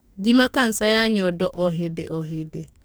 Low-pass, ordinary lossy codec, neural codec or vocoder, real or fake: none; none; codec, 44.1 kHz, 2.6 kbps, DAC; fake